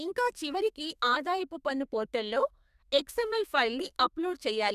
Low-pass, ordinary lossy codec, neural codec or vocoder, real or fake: 14.4 kHz; none; codec, 32 kHz, 1.9 kbps, SNAC; fake